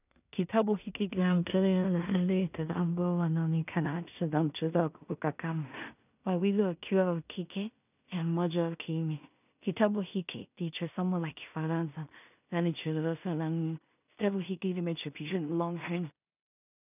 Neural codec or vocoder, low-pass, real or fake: codec, 16 kHz in and 24 kHz out, 0.4 kbps, LongCat-Audio-Codec, two codebook decoder; 3.6 kHz; fake